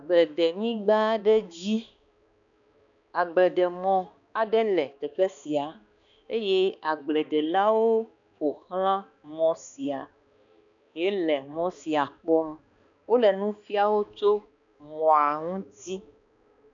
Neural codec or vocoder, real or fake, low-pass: codec, 16 kHz, 2 kbps, X-Codec, HuBERT features, trained on balanced general audio; fake; 7.2 kHz